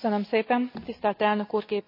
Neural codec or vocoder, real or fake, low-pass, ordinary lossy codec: none; real; 5.4 kHz; MP3, 48 kbps